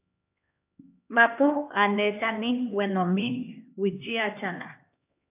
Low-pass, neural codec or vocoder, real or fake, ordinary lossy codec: 3.6 kHz; codec, 16 kHz, 2 kbps, X-Codec, HuBERT features, trained on LibriSpeech; fake; AAC, 32 kbps